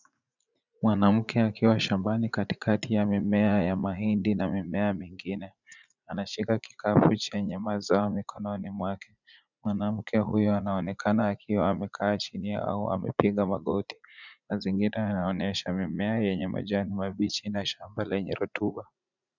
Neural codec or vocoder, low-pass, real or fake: vocoder, 44.1 kHz, 80 mel bands, Vocos; 7.2 kHz; fake